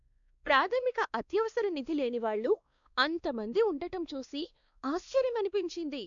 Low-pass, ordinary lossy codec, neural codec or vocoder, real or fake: 7.2 kHz; MP3, 96 kbps; codec, 16 kHz, 2 kbps, X-Codec, WavLM features, trained on Multilingual LibriSpeech; fake